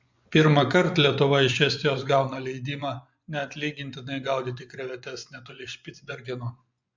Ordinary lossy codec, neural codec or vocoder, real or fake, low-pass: MP3, 64 kbps; vocoder, 24 kHz, 100 mel bands, Vocos; fake; 7.2 kHz